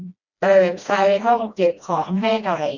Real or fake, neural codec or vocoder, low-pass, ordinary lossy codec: fake; codec, 16 kHz, 1 kbps, FreqCodec, smaller model; 7.2 kHz; none